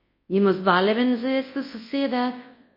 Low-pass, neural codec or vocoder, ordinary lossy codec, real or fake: 5.4 kHz; codec, 24 kHz, 0.9 kbps, WavTokenizer, large speech release; MP3, 24 kbps; fake